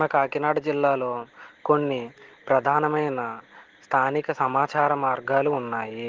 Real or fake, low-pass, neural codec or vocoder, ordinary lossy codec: real; 7.2 kHz; none; Opus, 16 kbps